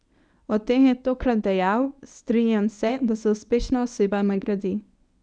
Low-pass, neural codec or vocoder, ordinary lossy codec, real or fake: 9.9 kHz; codec, 24 kHz, 0.9 kbps, WavTokenizer, medium speech release version 1; none; fake